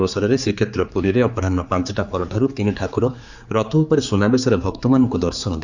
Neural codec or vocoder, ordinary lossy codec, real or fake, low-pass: codec, 16 kHz, 4 kbps, X-Codec, HuBERT features, trained on general audio; Opus, 64 kbps; fake; 7.2 kHz